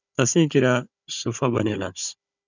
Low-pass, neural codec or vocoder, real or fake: 7.2 kHz; codec, 16 kHz, 16 kbps, FunCodec, trained on Chinese and English, 50 frames a second; fake